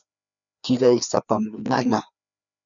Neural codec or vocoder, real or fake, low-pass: codec, 16 kHz, 2 kbps, FreqCodec, larger model; fake; 7.2 kHz